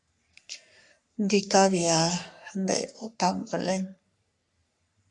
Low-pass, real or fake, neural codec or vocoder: 10.8 kHz; fake; codec, 44.1 kHz, 3.4 kbps, Pupu-Codec